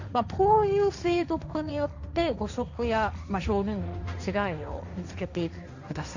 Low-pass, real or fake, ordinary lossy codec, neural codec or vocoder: 7.2 kHz; fake; none; codec, 16 kHz, 1.1 kbps, Voila-Tokenizer